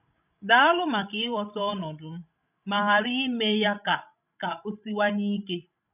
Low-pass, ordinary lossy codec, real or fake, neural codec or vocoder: 3.6 kHz; none; fake; codec, 16 kHz, 16 kbps, FreqCodec, larger model